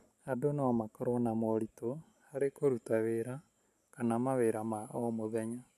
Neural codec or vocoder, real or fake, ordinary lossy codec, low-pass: none; real; none; 14.4 kHz